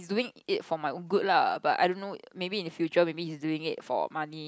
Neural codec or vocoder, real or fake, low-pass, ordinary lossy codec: none; real; none; none